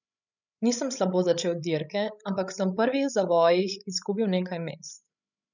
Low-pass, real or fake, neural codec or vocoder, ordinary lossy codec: 7.2 kHz; fake; codec, 16 kHz, 16 kbps, FreqCodec, larger model; none